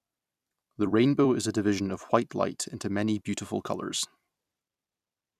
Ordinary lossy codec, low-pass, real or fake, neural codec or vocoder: none; 14.4 kHz; fake; vocoder, 44.1 kHz, 128 mel bands every 256 samples, BigVGAN v2